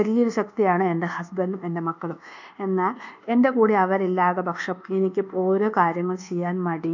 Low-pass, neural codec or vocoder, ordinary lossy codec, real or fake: 7.2 kHz; codec, 24 kHz, 1.2 kbps, DualCodec; none; fake